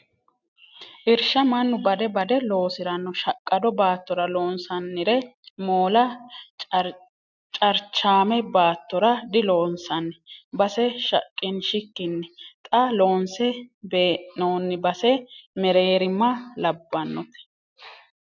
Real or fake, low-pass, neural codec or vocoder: real; 7.2 kHz; none